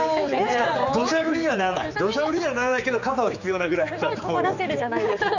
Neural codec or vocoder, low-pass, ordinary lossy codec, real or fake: codec, 16 kHz, 4 kbps, X-Codec, HuBERT features, trained on general audio; 7.2 kHz; none; fake